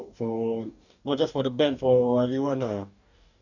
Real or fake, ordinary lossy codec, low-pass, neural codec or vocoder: fake; none; 7.2 kHz; codec, 44.1 kHz, 2.6 kbps, DAC